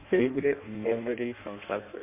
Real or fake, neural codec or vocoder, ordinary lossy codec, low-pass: fake; codec, 16 kHz in and 24 kHz out, 0.6 kbps, FireRedTTS-2 codec; MP3, 24 kbps; 3.6 kHz